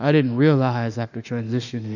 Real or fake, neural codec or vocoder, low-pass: fake; autoencoder, 48 kHz, 32 numbers a frame, DAC-VAE, trained on Japanese speech; 7.2 kHz